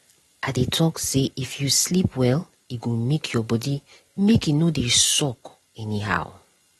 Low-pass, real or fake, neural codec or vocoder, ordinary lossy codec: 19.8 kHz; real; none; AAC, 32 kbps